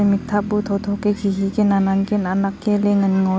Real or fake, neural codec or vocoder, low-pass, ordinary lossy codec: real; none; none; none